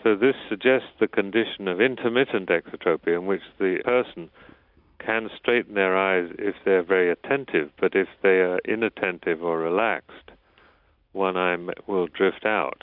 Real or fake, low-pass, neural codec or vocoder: real; 5.4 kHz; none